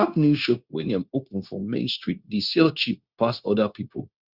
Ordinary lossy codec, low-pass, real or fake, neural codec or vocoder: Opus, 64 kbps; 5.4 kHz; fake; codec, 16 kHz, 0.9 kbps, LongCat-Audio-Codec